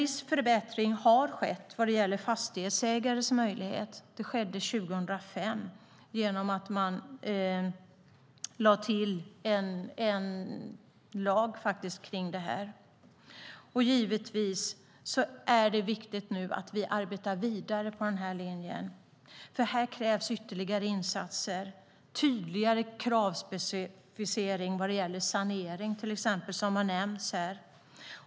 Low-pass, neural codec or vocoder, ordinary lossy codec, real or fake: none; none; none; real